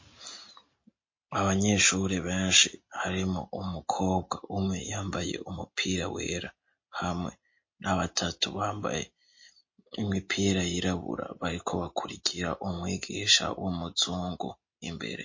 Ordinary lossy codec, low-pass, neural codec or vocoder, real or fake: MP3, 32 kbps; 7.2 kHz; none; real